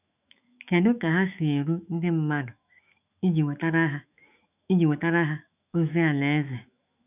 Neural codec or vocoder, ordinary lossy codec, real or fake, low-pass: autoencoder, 48 kHz, 128 numbers a frame, DAC-VAE, trained on Japanese speech; none; fake; 3.6 kHz